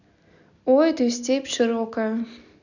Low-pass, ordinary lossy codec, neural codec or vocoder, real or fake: 7.2 kHz; none; none; real